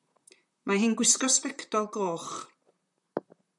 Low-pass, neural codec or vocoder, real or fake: 10.8 kHz; vocoder, 44.1 kHz, 128 mel bands, Pupu-Vocoder; fake